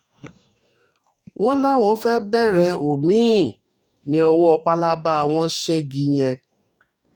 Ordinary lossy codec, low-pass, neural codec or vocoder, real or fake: none; 19.8 kHz; codec, 44.1 kHz, 2.6 kbps, DAC; fake